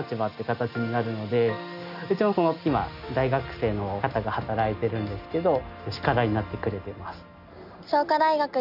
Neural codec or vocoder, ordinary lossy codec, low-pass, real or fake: none; none; 5.4 kHz; real